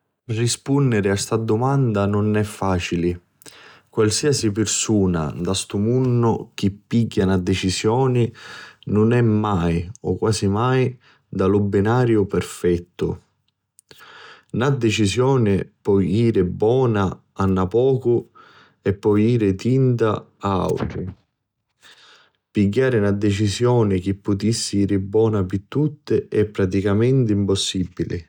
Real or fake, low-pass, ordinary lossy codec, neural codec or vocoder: real; 19.8 kHz; none; none